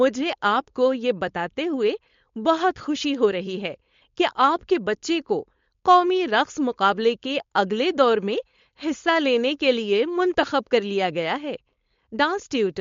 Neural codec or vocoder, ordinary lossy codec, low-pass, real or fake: codec, 16 kHz, 4.8 kbps, FACodec; MP3, 48 kbps; 7.2 kHz; fake